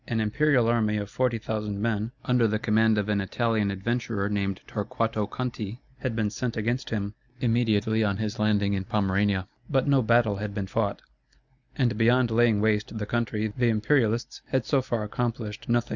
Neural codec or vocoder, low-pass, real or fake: none; 7.2 kHz; real